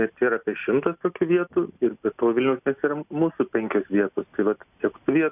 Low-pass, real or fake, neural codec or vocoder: 3.6 kHz; real; none